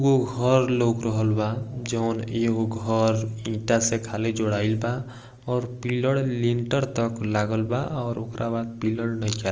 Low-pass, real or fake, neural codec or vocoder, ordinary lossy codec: 7.2 kHz; real; none; Opus, 24 kbps